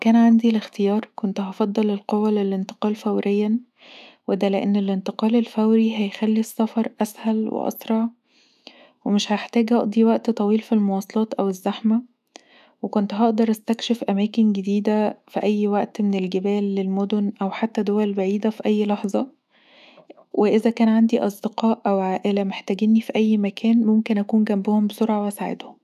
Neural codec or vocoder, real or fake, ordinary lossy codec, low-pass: autoencoder, 48 kHz, 128 numbers a frame, DAC-VAE, trained on Japanese speech; fake; none; 14.4 kHz